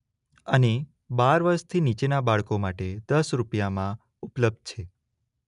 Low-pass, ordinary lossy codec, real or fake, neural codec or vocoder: 10.8 kHz; none; real; none